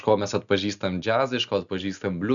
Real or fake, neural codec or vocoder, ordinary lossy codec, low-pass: real; none; MP3, 96 kbps; 7.2 kHz